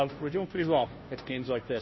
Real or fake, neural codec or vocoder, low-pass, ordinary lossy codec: fake; codec, 16 kHz, 0.5 kbps, FunCodec, trained on Chinese and English, 25 frames a second; 7.2 kHz; MP3, 24 kbps